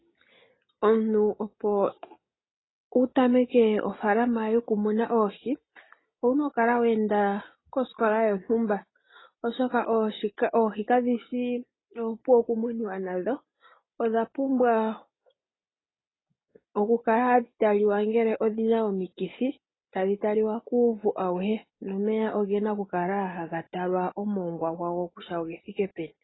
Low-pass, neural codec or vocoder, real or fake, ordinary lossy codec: 7.2 kHz; none; real; AAC, 16 kbps